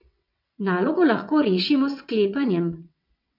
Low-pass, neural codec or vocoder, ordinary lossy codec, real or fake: 5.4 kHz; vocoder, 22.05 kHz, 80 mel bands, WaveNeXt; MP3, 48 kbps; fake